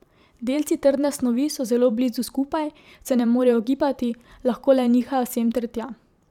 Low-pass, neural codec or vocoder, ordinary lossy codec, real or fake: 19.8 kHz; vocoder, 44.1 kHz, 128 mel bands every 512 samples, BigVGAN v2; none; fake